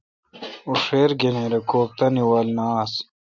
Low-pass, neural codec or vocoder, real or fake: 7.2 kHz; none; real